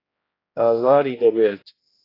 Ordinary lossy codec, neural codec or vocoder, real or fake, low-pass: AAC, 24 kbps; codec, 16 kHz, 1 kbps, X-Codec, HuBERT features, trained on general audio; fake; 5.4 kHz